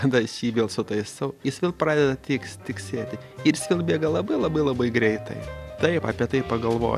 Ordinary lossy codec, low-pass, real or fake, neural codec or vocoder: AAC, 96 kbps; 14.4 kHz; real; none